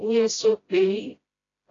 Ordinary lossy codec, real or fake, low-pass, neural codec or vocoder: AAC, 32 kbps; fake; 7.2 kHz; codec, 16 kHz, 0.5 kbps, FreqCodec, smaller model